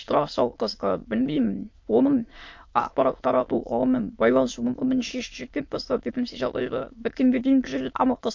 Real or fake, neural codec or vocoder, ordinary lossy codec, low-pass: fake; autoencoder, 22.05 kHz, a latent of 192 numbers a frame, VITS, trained on many speakers; MP3, 48 kbps; 7.2 kHz